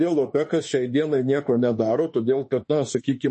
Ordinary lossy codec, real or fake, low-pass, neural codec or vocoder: MP3, 32 kbps; fake; 10.8 kHz; autoencoder, 48 kHz, 32 numbers a frame, DAC-VAE, trained on Japanese speech